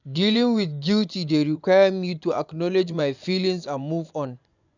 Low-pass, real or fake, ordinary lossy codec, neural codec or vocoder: 7.2 kHz; fake; none; vocoder, 24 kHz, 100 mel bands, Vocos